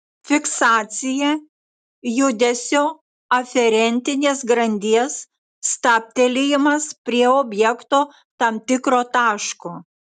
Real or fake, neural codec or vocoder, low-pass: real; none; 10.8 kHz